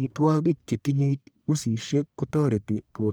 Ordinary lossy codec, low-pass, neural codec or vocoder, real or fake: none; none; codec, 44.1 kHz, 1.7 kbps, Pupu-Codec; fake